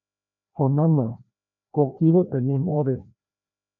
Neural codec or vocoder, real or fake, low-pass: codec, 16 kHz, 1 kbps, FreqCodec, larger model; fake; 7.2 kHz